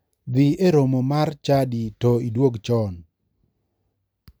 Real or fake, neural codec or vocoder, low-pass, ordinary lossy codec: real; none; none; none